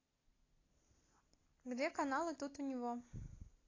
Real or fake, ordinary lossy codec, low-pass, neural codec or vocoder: real; AAC, 32 kbps; 7.2 kHz; none